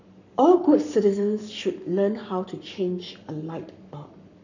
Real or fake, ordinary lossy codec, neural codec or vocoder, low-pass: fake; none; codec, 44.1 kHz, 7.8 kbps, Pupu-Codec; 7.2 kHz